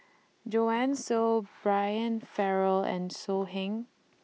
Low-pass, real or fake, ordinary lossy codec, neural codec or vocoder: none; real; none; none